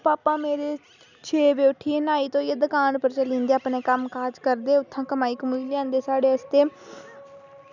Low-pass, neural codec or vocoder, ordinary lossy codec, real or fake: 7.2 kHz; none; none; real